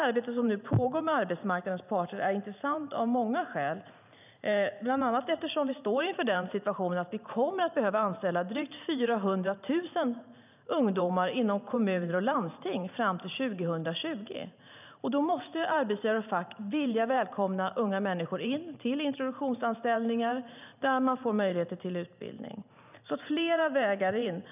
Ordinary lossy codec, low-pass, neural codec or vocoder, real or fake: none; 3.6 kHz; vocoder, 44.1 kHz, 128 mel bands every 512 samples, BigVGAN v2; fake